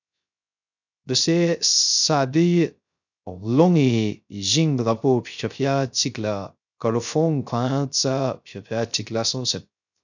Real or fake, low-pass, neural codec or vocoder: fake; 7.2 kHz; codec, 16 kHz, 0.3 kbps, FocalCodec